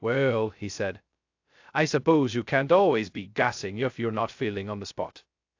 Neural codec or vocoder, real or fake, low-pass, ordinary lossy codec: codec, 16 kHz, 0.2 kbps, FocalCodec; fake; 7.2 kHz; AAC, 48 kbps